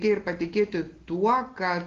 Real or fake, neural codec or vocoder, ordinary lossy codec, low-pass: real; none; Opus, 16 kbps; 7.2 kHz